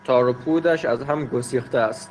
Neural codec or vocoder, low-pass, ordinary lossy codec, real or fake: none; 10.8 kHz; Opus, 16 kbps; real